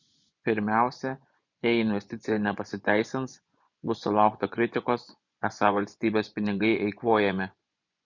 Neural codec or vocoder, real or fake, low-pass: vocoder, 24 kHz, 100 mel bands, Vocos; fake; 7.2 kHz